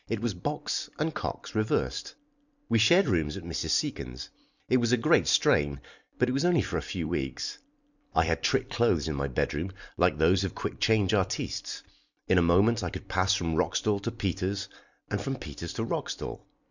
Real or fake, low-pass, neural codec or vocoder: fake; 7.2 kHz; vocoder, 44.1 kHz, 128 mel bands every 256 samples, BigVGAN v2